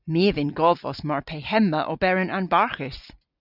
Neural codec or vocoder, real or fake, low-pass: none; real; 5.4 kHz